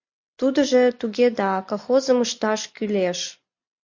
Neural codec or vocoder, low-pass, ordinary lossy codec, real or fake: none; 7.2 kHz; MP3, 48 kbps; real